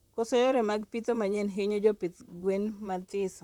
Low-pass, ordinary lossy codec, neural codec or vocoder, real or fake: 19.8 kHz; none; vocoder, 44.1 kHz, 128 mel bands, Pupu-Vocoder; fake